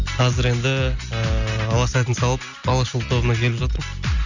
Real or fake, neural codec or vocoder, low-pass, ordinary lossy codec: real; none; 7.2 kHz; none